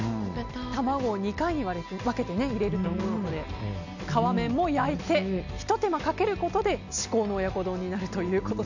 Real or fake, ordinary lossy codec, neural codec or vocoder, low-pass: real; none; none; 7.2 kHz